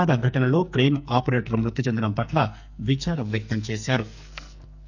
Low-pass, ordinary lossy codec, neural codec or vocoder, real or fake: 7.2 kHz; none; codec, 44.1 kHz, 2.6 kbps, SNAC; fake